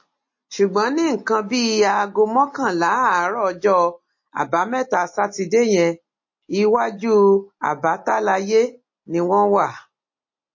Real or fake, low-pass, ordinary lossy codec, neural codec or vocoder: real; 7.2 kHz; MP3, 32 kbps; none